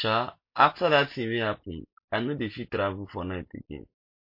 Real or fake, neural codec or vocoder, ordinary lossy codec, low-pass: real; none; MP3, 32 kbps; 5.4 kHz